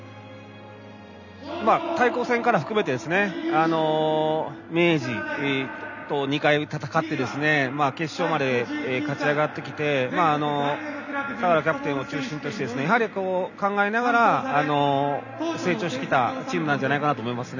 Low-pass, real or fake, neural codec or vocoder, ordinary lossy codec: 7.2 kHz; real; none; none